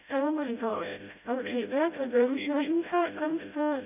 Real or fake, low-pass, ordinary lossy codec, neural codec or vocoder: fake; 3.6 kHz; none; codec, 16 kHz, 0.5 kbps, FreqCodec, smaller model